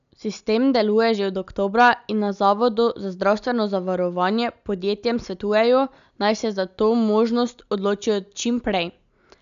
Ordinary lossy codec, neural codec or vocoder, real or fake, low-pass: none; none; real; 7.2 kHz